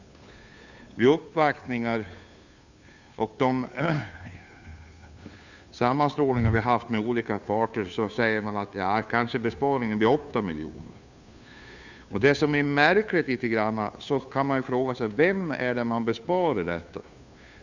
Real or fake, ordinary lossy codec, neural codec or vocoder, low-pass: fake; none; codec, 16 kHz, 2 kbps, FunCodec, trained on Chinese and English, 25 frames a second; 7.2 kHz